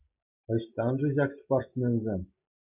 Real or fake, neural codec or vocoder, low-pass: real; none; 3.6 kHz